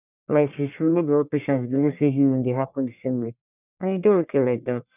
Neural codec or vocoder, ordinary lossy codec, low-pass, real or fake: codec, 44.1 kHz, 1.7 kbps, Pupu-Codec; none; 3.6 kHz; fake